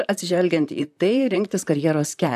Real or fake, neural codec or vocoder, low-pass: fake; vocoder, 44.1 kHz, 128 mel bands, Pupu-Vocoder; 14.4 kHz